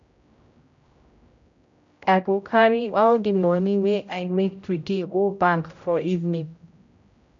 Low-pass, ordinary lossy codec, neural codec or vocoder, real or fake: 7.2 kHz; MP3, 64 kbps; codec, 16 kHz, 0.5 kbps, X-Codec, HuBERT features, trained on general audio; fake